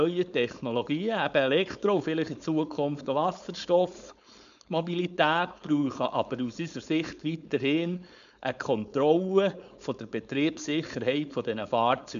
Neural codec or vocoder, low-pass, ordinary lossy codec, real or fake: codec, 16 kHz, 4.8 kbps, FACodec; 7.2 kHz; none; fake